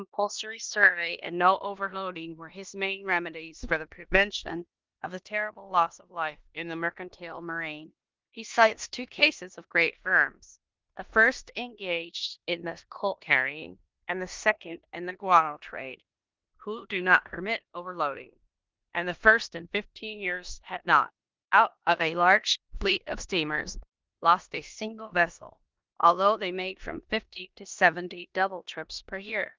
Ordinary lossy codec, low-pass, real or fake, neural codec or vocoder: Opus, 32 kbps; 7.2 kHz; fake; codec, 16 kHz in and 24 kHz out, 0.9 kbps, LongCat-Audio-Codec, four codebook decoder